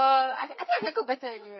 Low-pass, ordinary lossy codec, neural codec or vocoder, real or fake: 7.2 kHz; MP3, 24 kbps; codec, 32 kHz, 1.9 kbps, SNAC; fake